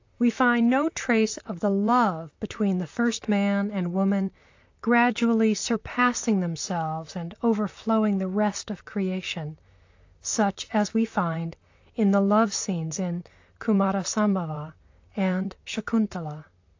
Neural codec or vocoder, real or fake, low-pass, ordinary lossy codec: vocoder, 44.1 kHz, 128 mel bands, Pupu-Vocoder; fake; 7.2 kHz; AAC, 48 kbps